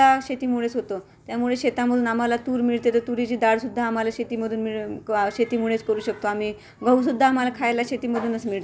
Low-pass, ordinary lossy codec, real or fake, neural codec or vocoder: none; none; real; none